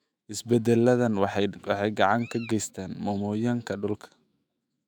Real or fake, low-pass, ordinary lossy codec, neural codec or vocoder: fake; 19.8 kHz; none; autoencoder, 48 kHz, 128 numbers a frame, DAC-VAE, trained on Japanese speech